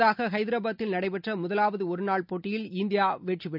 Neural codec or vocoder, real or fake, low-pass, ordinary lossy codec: none; real; 5.4 kHz; none